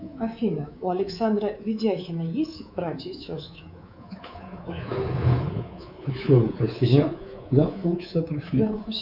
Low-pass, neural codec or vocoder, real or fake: 5.4 kHz; codec, 24 kHz, 3.1 kbps, DualCodec; fake